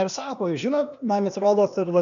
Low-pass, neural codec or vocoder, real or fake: 7.2 kHz; codec, 16 kHz, 0.8 kbps, ZipCodec; fake